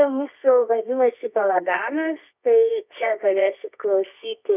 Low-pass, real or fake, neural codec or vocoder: 3.6 kHz; fake; codec, 24 kHz, 0.9 kbps, WavTokenizer, medium music audio release